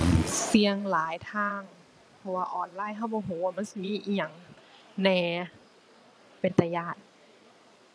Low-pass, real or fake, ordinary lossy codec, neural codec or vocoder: none; fake; none; vocoder, 22.05 kHz, 80 mel bands, Vocos